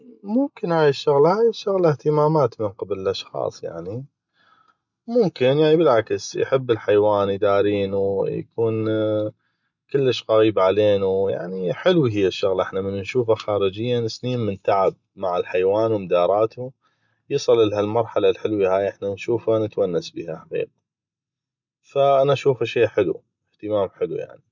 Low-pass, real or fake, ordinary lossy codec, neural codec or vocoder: 7.2 kHz; real; none; none